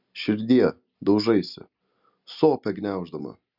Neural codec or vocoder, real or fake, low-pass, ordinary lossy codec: none; real; 5.4 kHz; Opus, 64 kbps